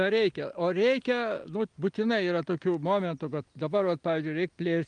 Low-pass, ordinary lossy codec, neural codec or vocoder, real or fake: 9.9 kHz; Opus, 32 kbps; none; real